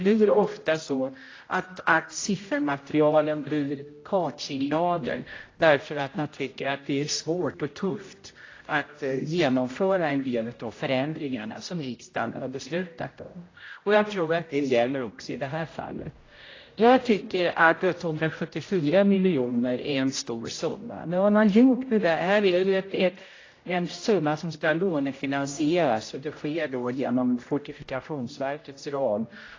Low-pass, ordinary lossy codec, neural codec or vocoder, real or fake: 7.2 kHz; AAC, 32 kbps; codec, 16 kHz, 0.5 kbps, X-Codec, HuBERT features, trained on general audio; fake